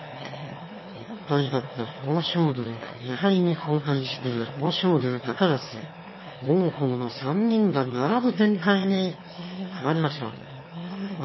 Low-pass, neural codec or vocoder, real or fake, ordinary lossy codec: 7.2 kHz; autoencoder, 22.05 kHz, a latent of 192 numbers a frame, VITS, trained on one speaker; fake; MP3, 24 kbps